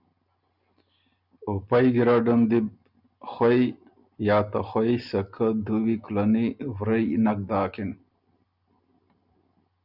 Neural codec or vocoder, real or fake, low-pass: none; real; 5.4 kHz